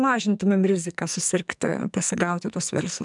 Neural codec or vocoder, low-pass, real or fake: codec, 44.1 kHz, 2.6 kbps, SNAC; 10.8 kHz; fake